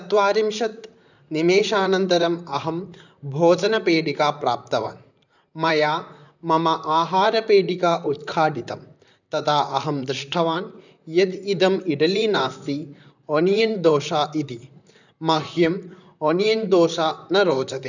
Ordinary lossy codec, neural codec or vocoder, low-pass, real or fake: none; vocoder, 44.1 kHz, 128 mel bands, Pupu-Vocoder; 7.2 kHz; fake